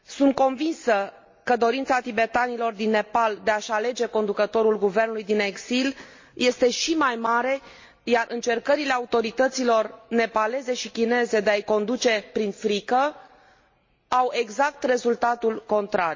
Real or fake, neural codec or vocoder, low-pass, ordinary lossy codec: real; none; 7.2 kHz; MP3, 32 kbps